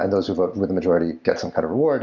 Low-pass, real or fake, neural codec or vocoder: 7.2 kHz; real; none